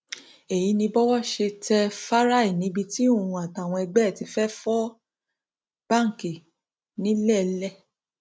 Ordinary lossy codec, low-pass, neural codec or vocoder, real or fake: none; none; none; real